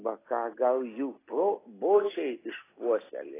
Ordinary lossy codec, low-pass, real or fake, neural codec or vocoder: AAC, 16 kbps; 3.6 kHz; real; none